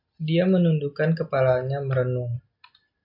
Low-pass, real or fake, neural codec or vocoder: 5.4 kHz; real; none